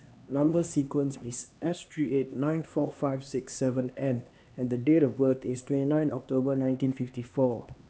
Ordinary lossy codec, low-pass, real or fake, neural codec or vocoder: none; none; fake; codec, 16 kHz, 2 kbps, X-Codec, HuBERT features, trained on LibriSpeech